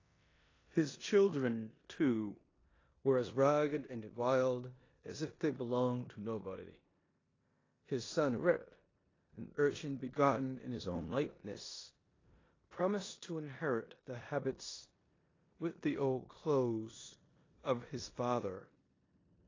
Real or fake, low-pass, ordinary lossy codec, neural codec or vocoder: fake; 7.2 kHz; AAC, 32 kbps; codec, 16 kHz in and 24 kHz out, 0.9 kbps, LongCat-Audio-Codec, four codebook decoder